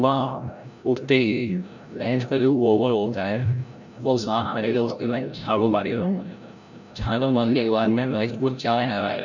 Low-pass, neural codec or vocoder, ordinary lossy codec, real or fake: 7.2 kHz; codec, 16 kHz, 0.5 kbps, FreqCodec, larger model; none; fake